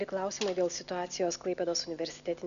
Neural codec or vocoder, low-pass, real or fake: none; 7.2 kHz; real